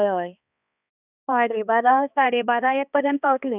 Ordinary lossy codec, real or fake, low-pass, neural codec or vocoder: none; fake; 3.6 kHz; codec, 16 kHz, 2 kbps, X-Codec, HuBERT features, trained on balanced general audio